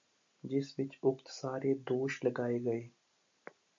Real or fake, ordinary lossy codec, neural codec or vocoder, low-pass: real; MP3, 48 kbps; none; 7.2 kHz